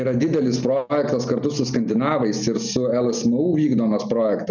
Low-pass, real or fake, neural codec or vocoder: 7.2 kHz; real; none